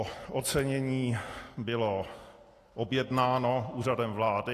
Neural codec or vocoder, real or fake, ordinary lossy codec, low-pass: none; real; AAC, 48 kbps; 14.4 kHz